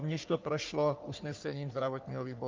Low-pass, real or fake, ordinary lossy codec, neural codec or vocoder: 7.2 kHz; fake; Opus, 24 kbps; codec, 44.1 kHz, 3.4 kbps, Pupu-Codec